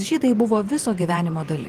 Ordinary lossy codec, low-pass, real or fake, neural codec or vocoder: Opus, 24 kbps; 14.4 kHz; fake; vocoder, 44.1 kHz, 128 mel bands every 512 samples, BigVGAN v2